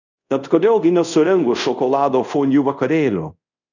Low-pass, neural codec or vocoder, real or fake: 7.2 kHz; codec, 24 kHz, 0.5 kbps, DualCodec; fake